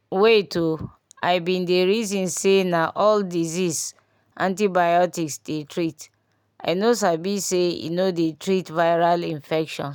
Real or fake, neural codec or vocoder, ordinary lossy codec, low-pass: real; none; none; 19.8 kHz